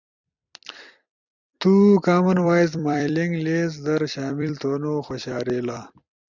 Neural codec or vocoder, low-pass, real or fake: vocoder, 44.1 kHz, 128 mel bands every 256 samples, BigVGAN v2; 7.2 kHz; fake